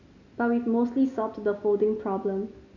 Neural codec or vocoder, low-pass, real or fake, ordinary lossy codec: none; 7.2 kHz; real; none